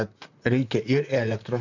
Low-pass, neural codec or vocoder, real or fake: 7.2 kHz; vocoder, 44.1 kHz, 128 mel bands, Pupu-Vocoder; fake